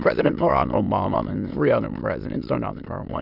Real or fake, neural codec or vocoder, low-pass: fake; autoencoder, 22.05 kHz, a latent of 192 numbers a frame, VITS, trained on many speakers; 5.4 kHz